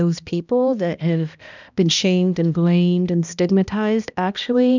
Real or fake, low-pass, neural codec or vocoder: fake; 7.2 kHz; codec, 16 kHz, 1 kbps, X-Codec, HuBERT features, trained on balanced general audio